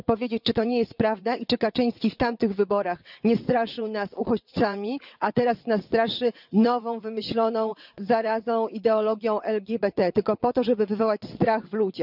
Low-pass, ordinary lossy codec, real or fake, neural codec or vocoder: 5.4 kHz; none; fake; vocoder, 22.05 kHz, 80 mel bands, WaveNeXt